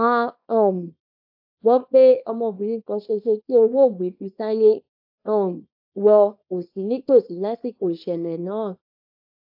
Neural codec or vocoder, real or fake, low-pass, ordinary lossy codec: codec, 24 kHz, 0.9 kbps, WavTokenizer, small release; fake; 5.4 kHz; AAC, 48 kbps